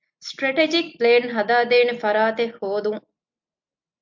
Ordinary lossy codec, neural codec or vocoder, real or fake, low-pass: MP3, 48 kbps; none; real; 7.2 kHz